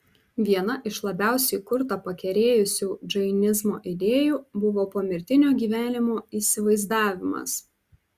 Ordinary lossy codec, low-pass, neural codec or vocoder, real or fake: Opus, 64 kbps; 14.4 kHz; none; real